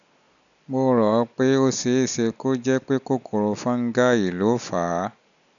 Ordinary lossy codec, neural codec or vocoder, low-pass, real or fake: none; none; 7.2 kHz; real